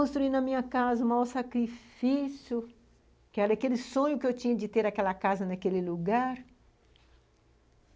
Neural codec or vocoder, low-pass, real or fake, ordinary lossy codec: none; none; real; none